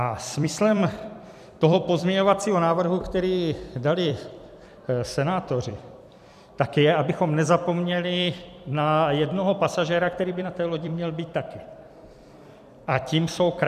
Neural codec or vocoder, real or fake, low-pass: vocoder, 44.1 kHz, 128 mel bands every 256 samples, BigVGAN v2; fake; 14.4 kHz